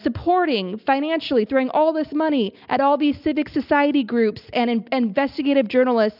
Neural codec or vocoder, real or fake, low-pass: none; real; 5.4 kHz